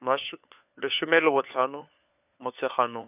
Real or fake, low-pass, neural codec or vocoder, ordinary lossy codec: fake; 3.6 kHz; codec, 16 kHz, 4 kbps, FunCodec, trained on LibriTTS, 50 frames a second; none